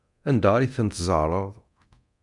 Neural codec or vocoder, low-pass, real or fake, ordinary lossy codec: codec, 24 kHz, 0.9 kbps, DualCodec; 10.8 kHz; fake; MP3, 96 kbps